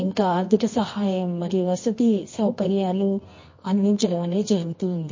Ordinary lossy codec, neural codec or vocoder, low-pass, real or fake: MP3, 32 kbps; codec, 24 kHz, 0.9 kbps, WavTokenizer, medium music audio release; 7.2 kHz; fake